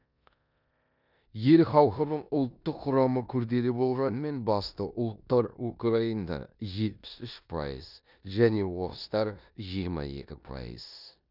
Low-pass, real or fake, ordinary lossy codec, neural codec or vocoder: 5.4 kHz; fake; none; codec, 16 kHz in and 24 kHz out, 0.9 kbps, LongCat-Audio-Codec, four codebook decoder